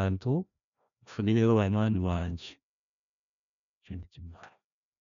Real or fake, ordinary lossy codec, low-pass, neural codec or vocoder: fake; none; 7.2 kHz; codec, 16 kHz, 1 kbps, FreqCodec, larger model